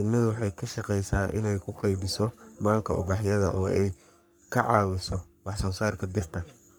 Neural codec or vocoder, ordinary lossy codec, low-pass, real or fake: codec, 44.1 kHz, 3.4 kbps, Pupu-Codec; none; none; fake